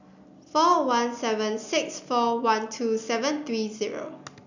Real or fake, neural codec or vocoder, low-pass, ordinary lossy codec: real; none; 7.2 kHz; none